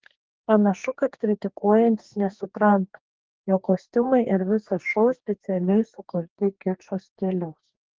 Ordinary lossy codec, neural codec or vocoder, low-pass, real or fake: Opus, 16 kbps; codec, 44.1 kHz, 2.6 kbps, DAC; 7.2 kHz; fake